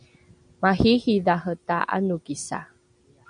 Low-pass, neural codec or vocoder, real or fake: 9.9 kHz; none; real